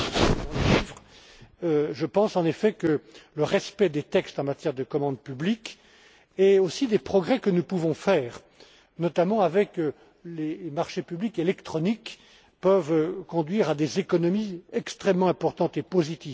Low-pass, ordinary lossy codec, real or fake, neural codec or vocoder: none; none; real; none